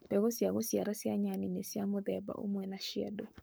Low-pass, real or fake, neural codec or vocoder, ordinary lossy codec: none; fake; codec, 44.1 kHz, 7.8 kbps, Pupu-Codec; none